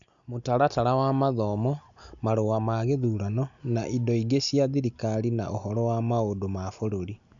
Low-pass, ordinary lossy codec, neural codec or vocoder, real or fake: 7.2 kHz; none; none; real